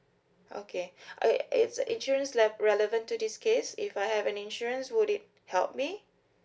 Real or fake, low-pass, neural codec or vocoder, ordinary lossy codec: real; none; none; none